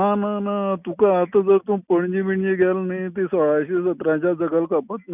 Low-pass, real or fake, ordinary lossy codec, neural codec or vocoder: 3.6 kHz; real; none; none